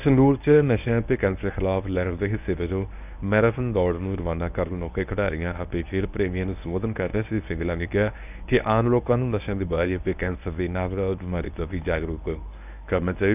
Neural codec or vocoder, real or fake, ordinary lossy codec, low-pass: codec, 24 kHz, 0.9 kbps, WavTokenizer, medium speech release version 1; fake; none; 3.6 kHz